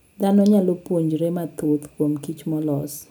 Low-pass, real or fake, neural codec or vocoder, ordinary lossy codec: none; real; none; none